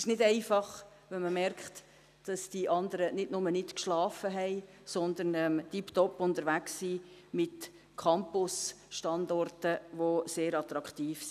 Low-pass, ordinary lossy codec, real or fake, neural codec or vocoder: 14.4 kHz; none; real; none